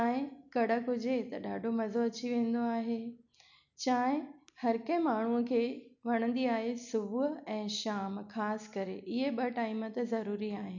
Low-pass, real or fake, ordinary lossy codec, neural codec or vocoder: 7.2 kHz; real; none; none